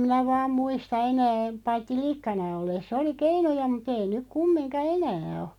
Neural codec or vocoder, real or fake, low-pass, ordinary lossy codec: none; real; 19.8 kHz; none